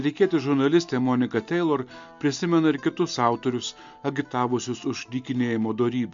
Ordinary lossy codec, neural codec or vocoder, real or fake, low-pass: MP3, 64 kbps; none; real; 7.2 kHz